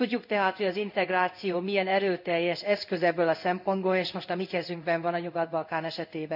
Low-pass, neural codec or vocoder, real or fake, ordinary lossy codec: 5.4 kHz; codec, 16 kHz in and 24 kHz out, 1 kbps, XY-Tokenizer; fake; none